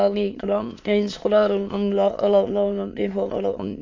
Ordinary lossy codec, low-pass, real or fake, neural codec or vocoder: AAC, 48 kbps; 7.2 kHz; fake; autoencoder, 22.05 kHz, a latent of 192 numbers a frame, VITS, trained on many speakers